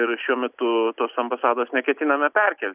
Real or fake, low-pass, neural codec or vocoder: real; 3.6 kHz; none